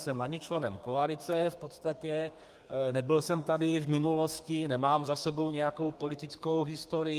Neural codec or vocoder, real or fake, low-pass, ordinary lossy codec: codec, 32 kHz, 1.9 kbps, SNAC; fake; 14.4 kHz; Opus, 32 kbps